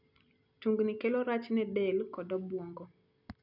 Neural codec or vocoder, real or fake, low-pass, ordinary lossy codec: none; real; 5.4 kHz; none